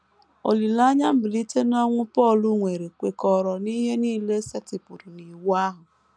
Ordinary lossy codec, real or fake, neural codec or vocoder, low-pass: none; real; none; none